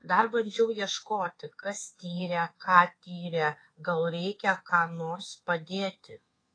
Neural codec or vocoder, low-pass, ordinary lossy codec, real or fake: codec, 24 kHz, 1.2 kbps, DualCodec; 9.9 kHz; AAC, 32 kbps; fake